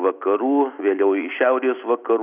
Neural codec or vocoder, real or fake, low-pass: none; real; 3.6 kHz